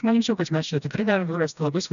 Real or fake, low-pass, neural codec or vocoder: fake; 7.2 kHz; codec, 16 kHz, 1 kbps, FreqCodec, smaller model